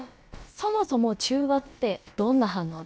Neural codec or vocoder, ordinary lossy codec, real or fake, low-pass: codec, 16 kHz, about 1 kbps, DyCAST, with the encoder's durations; none; fake; none